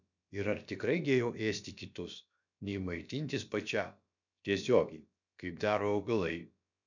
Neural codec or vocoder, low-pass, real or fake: codec, 16 kHz, about 1 kbps, DyCAST, with the encoder's durations; 7.2 kHz; fake